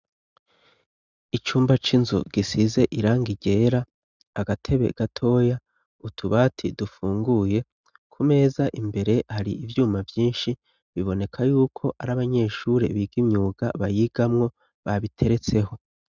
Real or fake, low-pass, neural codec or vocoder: real; 7.2 kHz; none